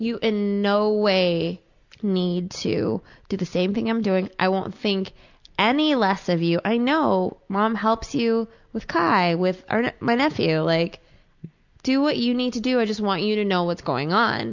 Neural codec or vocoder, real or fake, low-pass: none; real; 7.2 kHz